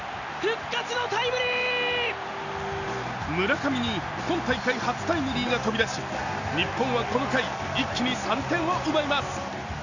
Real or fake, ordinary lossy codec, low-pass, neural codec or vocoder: real; none; 7.2 kHz; none